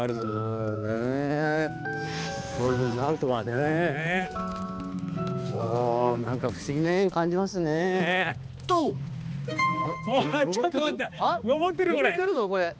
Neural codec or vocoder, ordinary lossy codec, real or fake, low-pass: codec, 16 kHz, 2 kbps, X-Codec, HuBERT features, trained on balanced general audio; none; fake; none